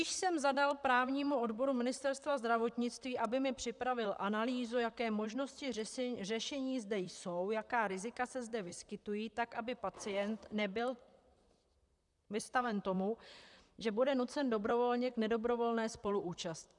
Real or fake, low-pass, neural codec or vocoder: fake; 10.8 kHz; vocoder, 44.1 kHz, 128 mel bands, Pupu-Vocoder